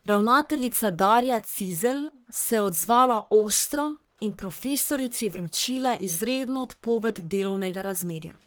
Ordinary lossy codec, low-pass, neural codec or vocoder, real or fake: none; none; codec, 44.1 kHz, 1.7 kbps, Pupu-Codec; fake